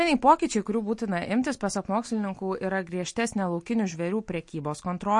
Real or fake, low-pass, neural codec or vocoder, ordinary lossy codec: real; 10.8 kHz; none; MP3, 48 kbps